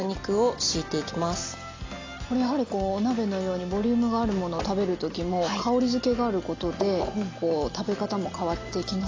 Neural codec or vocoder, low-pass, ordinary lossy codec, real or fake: none; 7.2 kHz; AAC, 32 kbps; real